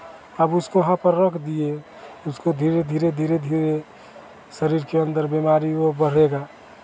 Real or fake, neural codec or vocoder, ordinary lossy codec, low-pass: real; none; none; none